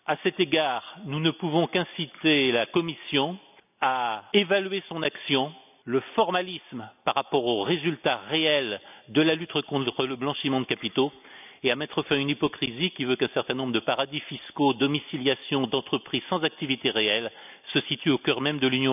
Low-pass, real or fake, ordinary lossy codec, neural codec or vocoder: 3.6 kHz; real; none; none